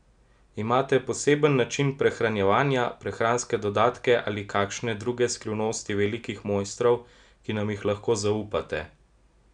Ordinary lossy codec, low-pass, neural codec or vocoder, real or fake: none; 9.9 kHz; none; real